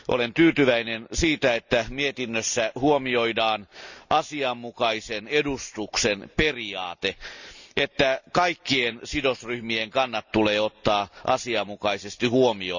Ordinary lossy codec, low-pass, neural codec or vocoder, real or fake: none; 7.2 kHz; none; real